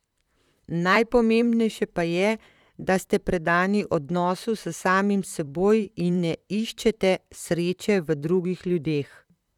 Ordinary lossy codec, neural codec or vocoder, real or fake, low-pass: none; vocoder, 44.1 kHz, 128 mel bands, Pupu-Vocoder; fake; 19.8 kHz